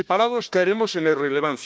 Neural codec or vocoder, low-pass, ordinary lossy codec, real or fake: codec, 16 kHz, 1 kbps, FunCodec, trained on Chinese and English, 50 frames a second; none; none; fake